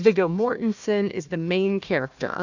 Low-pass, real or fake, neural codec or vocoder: 7.2 kHz; fake; codec, 16 kHz, 1 kbps, FunCodec, trained on Chinese and English, 50 frames a second